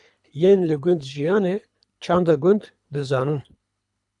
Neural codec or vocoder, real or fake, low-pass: codec, 24 kHz, 3 kbps, HILCodec; fake; 10.8 kHz